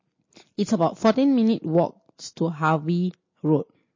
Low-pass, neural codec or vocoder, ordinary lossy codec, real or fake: 7.2 kHz; none; MP3, 32 kbps; real